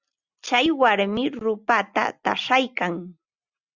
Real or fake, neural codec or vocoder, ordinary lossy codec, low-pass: real; none; Opus, 64 kbps; 7.2 kHz